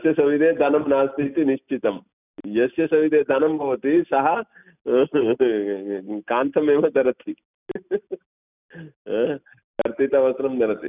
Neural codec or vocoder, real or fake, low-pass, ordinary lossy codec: none; real; 3.6 kHz; none